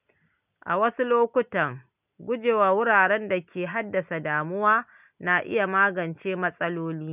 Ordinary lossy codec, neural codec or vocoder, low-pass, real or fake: none; none; 3.6 kHz; real